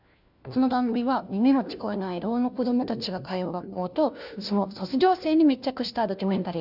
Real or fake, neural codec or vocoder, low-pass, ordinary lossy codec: fake; codec, 16 kHz, 1 kbps, FunCodec, trained on LibriTTS, 50 frames a second; 5.4 kHz; none